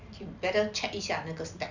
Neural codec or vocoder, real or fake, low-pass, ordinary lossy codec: none; real; 7.2 kHz; none